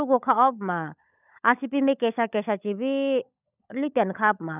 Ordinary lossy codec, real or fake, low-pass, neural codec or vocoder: none; fake; 3.6 kHz; codec, 16 kHz, 16 kbps, FunCodec, trained on LibriTTS, 50 frames a second